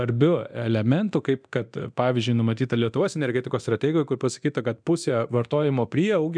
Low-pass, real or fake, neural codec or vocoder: 9.9 kHz; fake; codec, 24 kHz, 0.9 kbps, DualCodec